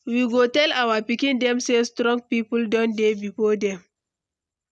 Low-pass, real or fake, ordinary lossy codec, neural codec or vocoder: none; real; none; none